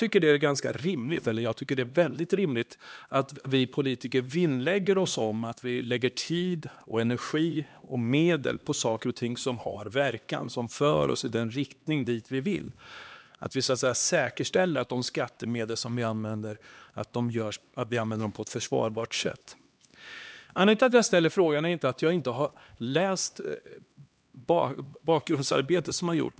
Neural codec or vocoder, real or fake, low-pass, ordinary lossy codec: codec, 16 kHz, 2 kbps, X-Codec, HuBERT features, trained on LibriSpeech; fake; none; none